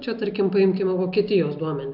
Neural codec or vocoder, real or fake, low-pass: none; real; 5.4 kHz